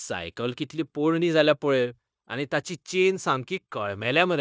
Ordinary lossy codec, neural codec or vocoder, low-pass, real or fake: none; codec, 16 kHz, 0.9 kbps, LongCat-Audio-Codec; none; fake